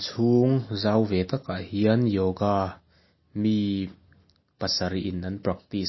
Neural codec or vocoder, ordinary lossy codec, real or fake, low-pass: none; MP3, 24 kbps; real; 7.2 kHz